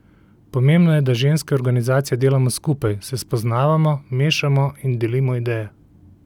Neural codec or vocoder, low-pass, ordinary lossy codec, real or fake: none; 19.8 kHz; none; real